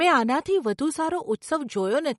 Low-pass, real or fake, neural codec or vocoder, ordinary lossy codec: 19.8 kHz; real; none; MP3, 48 kbps